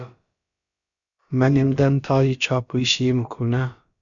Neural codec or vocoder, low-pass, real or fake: codec, 16 kHz, about 1 kbps, DyCAST, with the encoder's durations; 7.2 kHz; fake